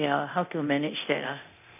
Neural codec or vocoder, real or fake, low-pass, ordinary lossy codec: codec, 24 kHz, 0.9 kbps, DualCodec; fake; 3.6 kHz; none